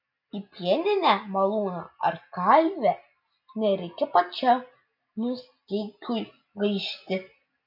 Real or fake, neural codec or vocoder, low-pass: real; none; 5.4 kHz